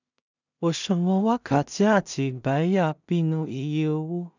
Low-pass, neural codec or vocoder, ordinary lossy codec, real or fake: 7.2 kHz; codec, 16 kHz in and 24 kHz out, 0.4 kbps, LongCat-Audio-Codec, two codebook decoder; none; fake